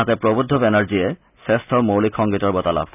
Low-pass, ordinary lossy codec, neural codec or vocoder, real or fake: 3.6 kHz; none; none; real